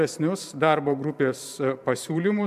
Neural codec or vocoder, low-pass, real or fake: vocoder, 44.1 kHz, 128 mel bands every 512 samples, BigVGAN v2; 14.4 kHz; fake